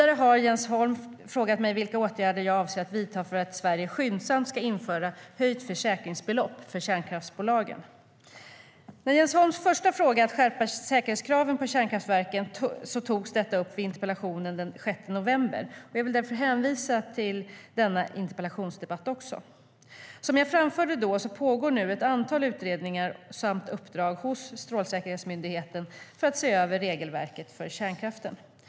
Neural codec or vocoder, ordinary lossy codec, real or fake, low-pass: none; none; real; none